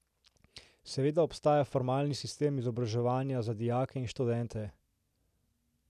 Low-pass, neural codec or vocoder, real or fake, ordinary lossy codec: 14.4 kHz; none; real; none